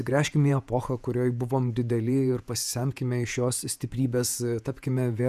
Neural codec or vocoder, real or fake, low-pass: vocoder, 48 kHz, 128 mel bands, Vocos; fake; 14.4 kHz